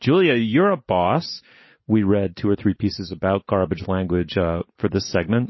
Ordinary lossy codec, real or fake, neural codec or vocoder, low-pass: MP3, 24 kbps; real; none; 7.2 kHz